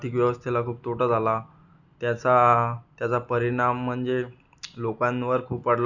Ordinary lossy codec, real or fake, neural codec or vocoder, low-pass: none; real; none; 7.2 kHz